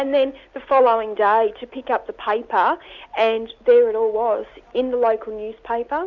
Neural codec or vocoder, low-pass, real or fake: none; 7.2 kHz; real